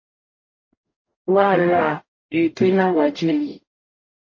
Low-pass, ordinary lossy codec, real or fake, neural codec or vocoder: 7.2 kHz; MP3, 32 kbps; fake; codec, 44.1 kHz, 0.9 kbps, DAC